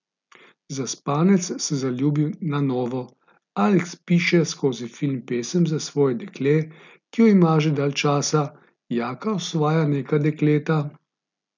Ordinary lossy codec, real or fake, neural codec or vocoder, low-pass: none; real; none; 7.2 kHz